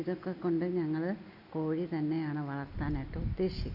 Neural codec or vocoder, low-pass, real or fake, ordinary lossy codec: none; 5.4 kHz; real; none